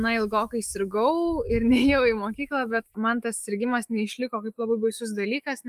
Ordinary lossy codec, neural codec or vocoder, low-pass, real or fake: Opus, 32 kbps; autoencoder, 48 kHz, 128 numbers a frame, DAC-VAE, trained on Japanese speech; 14.4 kHz; fake